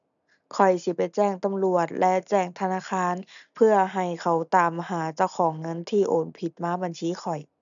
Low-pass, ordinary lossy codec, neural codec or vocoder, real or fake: 7.2 kHz; none; none; real